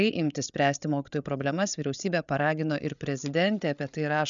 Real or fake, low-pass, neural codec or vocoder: fake; 7.2 kHz; codec, 16 kHz, 8 kbps, FreqCodec, larger model